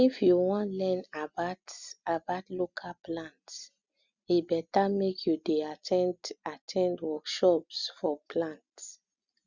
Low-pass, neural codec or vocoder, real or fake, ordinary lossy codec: 7.2 kHz; none; real; Opus, 64 kbps